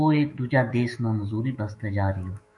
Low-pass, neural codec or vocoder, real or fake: 10.8 kHz; autoencoder, 48 kHz, 128 numbers a frame, DAC-VAE, trained on Japanese speech; fake